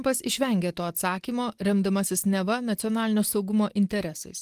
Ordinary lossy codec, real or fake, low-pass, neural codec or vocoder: Opus, 24 kbps; real; 14.4 kHz; none